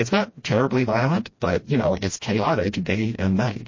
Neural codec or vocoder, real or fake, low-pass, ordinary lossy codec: codec, 16 kHz, 1 kbps, FreqCodec, smaller model; fake; 7.2 kHz; MP3, 32 kbps